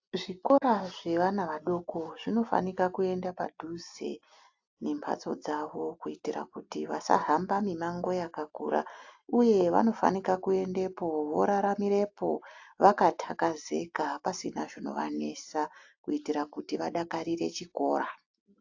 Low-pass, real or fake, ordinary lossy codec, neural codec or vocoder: 7.2 kHz; real; AAC, 48 kbps; none